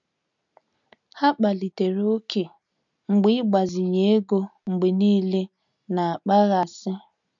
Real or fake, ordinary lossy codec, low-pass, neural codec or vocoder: real; none; 7.2 kHz; none